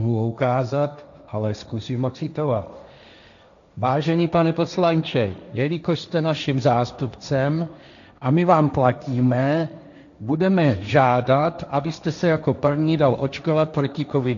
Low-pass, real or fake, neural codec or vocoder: 7.2 kHz; fake; codec, 16 kHz, 1.1 kbps, Voila-Tokenizer